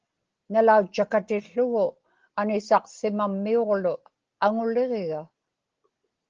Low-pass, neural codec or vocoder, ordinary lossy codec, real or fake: 7.2 kHz; none; Opus, 16 kbps; real